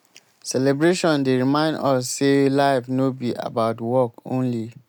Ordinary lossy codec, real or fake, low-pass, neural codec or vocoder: none; real; 19.8 kHz; none